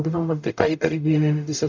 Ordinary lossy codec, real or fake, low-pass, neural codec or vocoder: none; fake; 7.2 kHz; codec, 44.1 kHz, 0.9 kbps, DAC